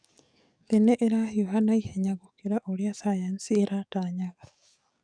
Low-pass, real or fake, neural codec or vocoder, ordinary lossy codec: 9.9 kHz; fake; codec, 44.1 kHz, 7.8 kbps, DAC; none